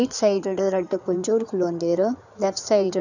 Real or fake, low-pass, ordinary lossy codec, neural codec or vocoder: fake; 7.2 kHz; none; codec, 16 kHz in and 24 kHz out, 2.2 kbps, FireRedTTS-2 codec